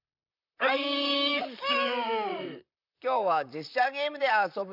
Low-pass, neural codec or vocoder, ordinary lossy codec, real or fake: 5.4 kHz; vocoder, 44.1 kHz, 128 mel bands, Pupu-Vocoder; none; fake